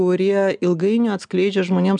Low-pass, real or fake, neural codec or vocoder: 9.9 kHz; real; none